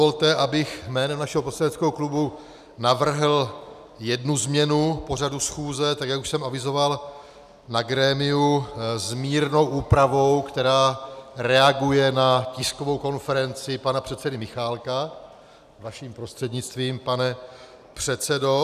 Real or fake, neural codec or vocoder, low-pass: real; none; 14.4 kHz